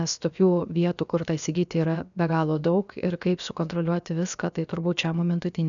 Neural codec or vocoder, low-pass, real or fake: codec, 16 kHz, about 1 kbps, DyCAST, with the encoder's durations; 7.2 kHz; fake